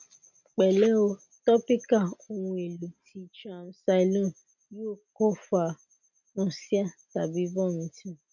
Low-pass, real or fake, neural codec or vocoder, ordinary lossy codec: 7.2 kHz; real; none; none